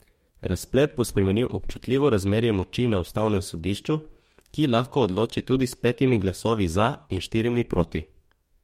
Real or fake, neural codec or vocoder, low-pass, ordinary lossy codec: fake; codec, 44.1 kHz, 2.6 kbps, DAC; 19.8 kHz; MP3, 64 kbps